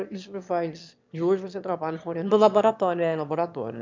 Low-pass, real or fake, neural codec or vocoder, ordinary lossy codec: 7.2 kHz; fake; autoencoder, 22.05 kHz, a latent of 192 numbers a frame, VITS, trained on one speaker; MP3, 64 kbps